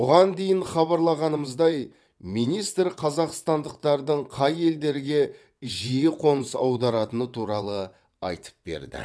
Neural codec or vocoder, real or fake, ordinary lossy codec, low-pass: vocoder, 22.05 kHz, 80 mel bands, WaveNeXt; fake; none; none